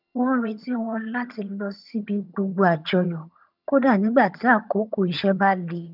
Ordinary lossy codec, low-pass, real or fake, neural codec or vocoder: none; 5.4 kHz; fake; vocoder, 22.05 kHz, 80 mel bands, HiFi-GAN